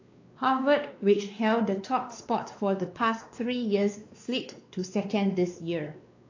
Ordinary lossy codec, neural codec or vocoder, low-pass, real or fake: AAC, 48 kbps; codec, 16 kHz, 2 kbps, X-Codec, WavLM features, trained on Multilingual LibriSpeech; 7.2 kHz; fake